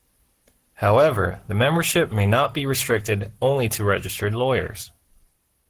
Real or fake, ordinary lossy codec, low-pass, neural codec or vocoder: fake; Opus, 16 kbps; 14.4 kHz; codec, 44.1 kHz, 7.8 kbps, Pupu-Codec